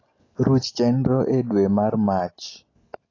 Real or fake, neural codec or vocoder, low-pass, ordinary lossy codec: real; none; 7.2 kHz; AAC, 32 kbps